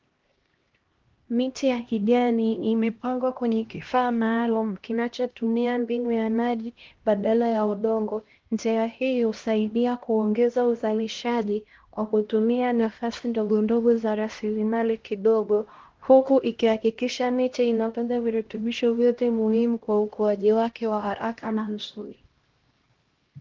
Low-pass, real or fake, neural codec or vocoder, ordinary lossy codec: 7.2 kHz; fake; codec, 16 kHz, 1 kbps, X-Codec, HuBERT features, trained on LibriSpeech; Opus, 16 kbps